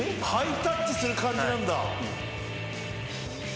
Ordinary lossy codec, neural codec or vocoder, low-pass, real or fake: none; none; none; real